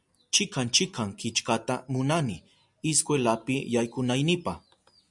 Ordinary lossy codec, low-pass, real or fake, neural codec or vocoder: MP3, 96 kbps; 10.8 kHz; real; none